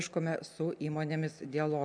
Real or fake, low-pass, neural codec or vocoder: real; 9.9 kHz; none